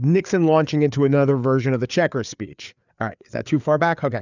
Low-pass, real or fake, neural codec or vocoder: 7.2 kHz; fake; codec, 16 kHz, 4 kbps, FreqCodec, larger model